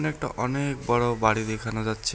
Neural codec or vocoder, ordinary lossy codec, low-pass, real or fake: none; none; none; real